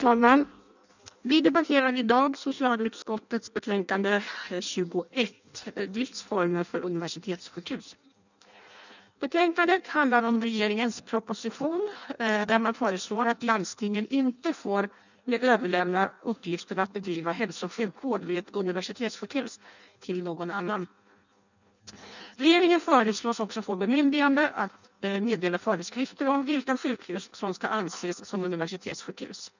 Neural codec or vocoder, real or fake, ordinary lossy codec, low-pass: codec, 16 kHz in and 24 kHz out, 0.6 kbps, FireRedTTS-2 codec; fake; none; 7.2 kHz